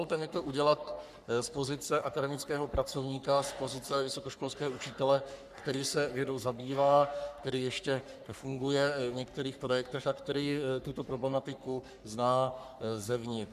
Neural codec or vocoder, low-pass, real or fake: codec, 44.1 kHz, 3.4 kbps, Pupu-Codec; 14.4 kHz; fake